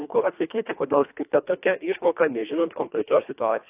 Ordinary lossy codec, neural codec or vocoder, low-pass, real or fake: AAC, 32 kbps; codec, 24 kHz, 1.5 kbps, HILCodec; 3.6 kHz; fake